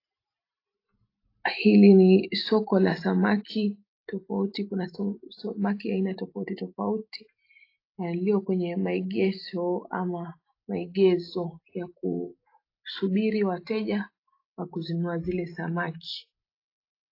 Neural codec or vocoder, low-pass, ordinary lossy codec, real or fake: none; 5.4 kHz; AAC, 32 kbps; real